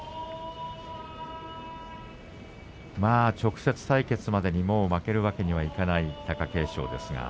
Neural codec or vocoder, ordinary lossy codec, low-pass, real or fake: none; none; none; real